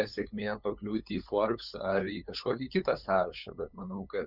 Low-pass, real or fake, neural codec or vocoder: 5.4 kHz; fake; codec, 16 kHz, 8 kbps, FunCodec, trained on LibriTTS, 25 frames a second